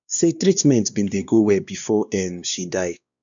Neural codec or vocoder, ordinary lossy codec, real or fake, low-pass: codec, 16 kHz, 2 kbps, X-Codec, WavLM features, trained on Multilingual LibriSpeech; none; fake; 7.2 kHz